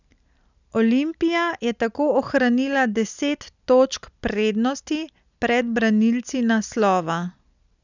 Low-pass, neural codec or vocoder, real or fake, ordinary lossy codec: 7.2 kHz; none; real; none